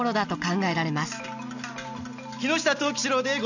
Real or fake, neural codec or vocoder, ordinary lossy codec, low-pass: real; none; none; 7.2 kHz